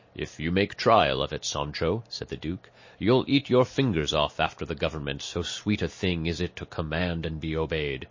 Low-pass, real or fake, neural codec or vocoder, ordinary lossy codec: 7.2 kHz; real; none; MP3, 32 kbps